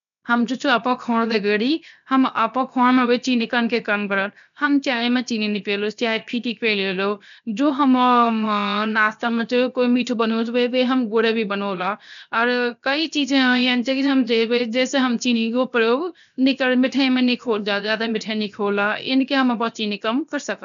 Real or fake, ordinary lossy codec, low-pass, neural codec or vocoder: fake; none; 7.2 kHz; codec, 16 kHz, 0.7 kbps, FocalCodec